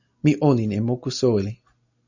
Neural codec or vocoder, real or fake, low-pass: none; real; 7.2 kHz